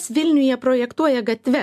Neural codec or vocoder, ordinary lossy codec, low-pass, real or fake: none; AAC, 96 kbps; 14.4 kHz; real